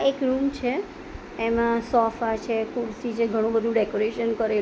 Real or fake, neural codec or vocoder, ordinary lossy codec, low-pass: real; none; none; none